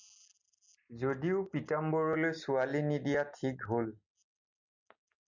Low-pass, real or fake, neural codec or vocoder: 7.2 kHz; real; none